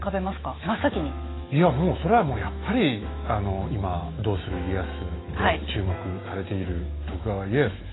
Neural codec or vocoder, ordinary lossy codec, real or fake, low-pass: autoencoder, 48 kHz, 128 numbers a frame, DAC-VAE, trained on Japanese speech; AAC, 16 kbps; fake; 7.2 kHz